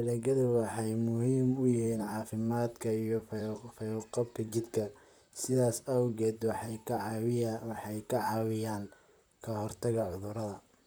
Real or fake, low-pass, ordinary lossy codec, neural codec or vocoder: fake; none; none; vocoder, 44.1 kHz, 128 mel bands, Pupu-Vocoder